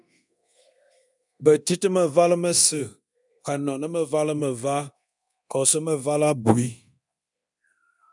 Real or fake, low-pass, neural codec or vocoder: fake; 10.8 kHz; codec, 24 kHz, 0.9 kbps, DualCodec